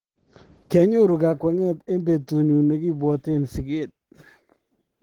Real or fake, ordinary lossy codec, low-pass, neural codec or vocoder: fake; Opus, 16 kbps; 19.8 kHz; codec, 44.1 kHz, 7.8 kbps, Pupu-Codec